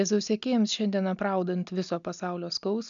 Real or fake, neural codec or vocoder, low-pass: real; none; 7.2 kHz